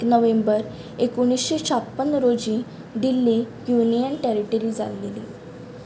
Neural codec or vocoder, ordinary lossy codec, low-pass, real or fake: none; none; none; real